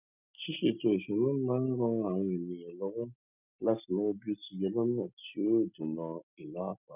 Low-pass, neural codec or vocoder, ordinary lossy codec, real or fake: 3.6 kHz; none; none; real